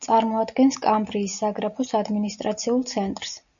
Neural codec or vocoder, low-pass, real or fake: none; 7.2 kHz; real